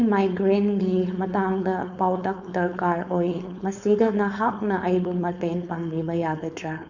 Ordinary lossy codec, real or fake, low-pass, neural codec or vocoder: none; fake; 7.2 kHz; codec, 16 kHz, 4.8 kbps, FACodec